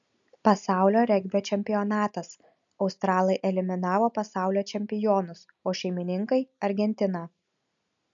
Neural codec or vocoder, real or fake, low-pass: none; real; 7.2 kHz